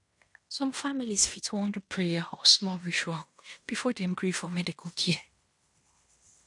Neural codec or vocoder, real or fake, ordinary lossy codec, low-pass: codec, 16 kHz in and 24 kHz out, 0.9 kbps, LongCat-Audio-Codec, fine tuned four codebook decoder; fake; MP3, 96 kbps; 10.8 kHz